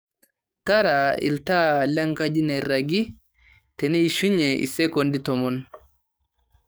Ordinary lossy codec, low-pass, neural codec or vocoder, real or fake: none; none; codec, 44.1 kHz, 7.8 kbps, DAC; fake